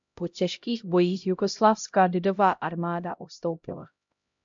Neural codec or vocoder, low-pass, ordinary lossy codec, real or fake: codec, 16 kHz, 0.5 kbps, X-Codec, HuBERT features, trained on LibriSpeech; 7.2 kHz; MP3, 64 kbps; fake